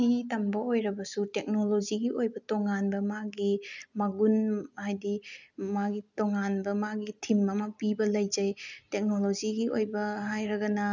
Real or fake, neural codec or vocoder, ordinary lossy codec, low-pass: real; none; none; 7.2 kHz